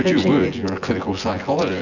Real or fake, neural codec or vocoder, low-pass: fake; vocoder, 24 kHz, 100 mel bands, Vocos; 7.2 kHz